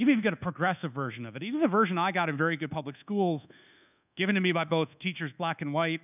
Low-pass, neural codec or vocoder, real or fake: 3.6 kHz; codec, 24 kHz, 1.2 kbps, DualCodec; fake